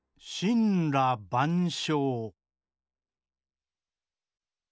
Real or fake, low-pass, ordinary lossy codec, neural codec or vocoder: real; none; none; none